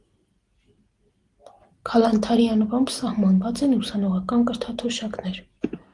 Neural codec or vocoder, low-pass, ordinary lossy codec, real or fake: none; 10.8 kHz; Opus, 24 kbps; real